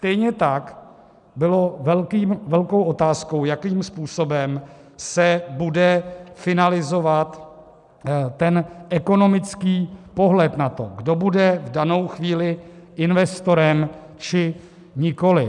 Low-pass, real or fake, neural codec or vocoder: 10.8 kHz; real; none